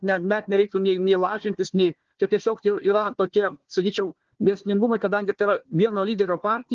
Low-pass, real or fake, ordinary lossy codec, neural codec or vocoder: 7.2 kHz; fake; Opus, 16 kbps; codec, 16 kHz, 1 kbps, FunCodec, trained on Chinese and English, 50 frames a second